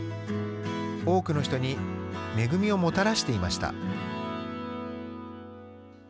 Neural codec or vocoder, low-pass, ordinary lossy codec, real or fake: none; none; none; real